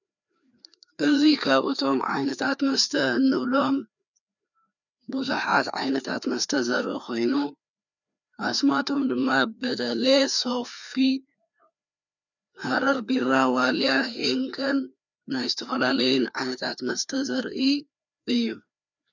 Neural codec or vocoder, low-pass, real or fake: codec, 16 kHz, 2 kbps, FreqCodec, larger model; 7.2 kHz; fake